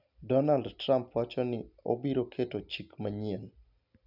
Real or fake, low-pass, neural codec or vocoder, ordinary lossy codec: real; 5.4 kHz; none; none